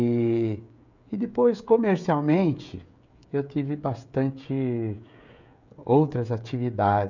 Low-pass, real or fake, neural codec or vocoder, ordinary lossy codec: 7.2 kHz; fake; codec, 16 kHz, 16 kbps, FreqCodec, smaller model; none